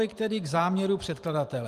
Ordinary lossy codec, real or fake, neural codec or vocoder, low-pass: Opus, 32 kbps; fake; vocoder, 44.1 kHz, 128 mel bands every 512 samples, BigVGAN v2; 14.4 kHz